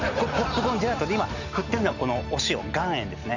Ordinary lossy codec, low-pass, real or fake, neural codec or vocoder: none; 7.2 kHz; real; none